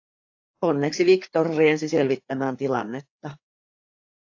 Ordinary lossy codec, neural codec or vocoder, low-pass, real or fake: AAC, 48 kbps; codec, 16 kHz, 4 kbps, FreqCodec, larger model; 7.2 kHz; fake